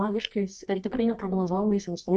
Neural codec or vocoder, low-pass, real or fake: codec, 24 kHz, 0.9 kbps, WavTokenizer, medium music audio release; 10.8 kHz; fake